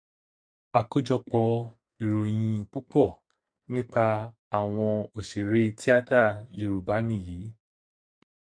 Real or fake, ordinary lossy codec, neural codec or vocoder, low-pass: fake; MP3, 64 kbps; codec, 44.1 kHz, 2.6 kbps, SNAC; 9.9 kHz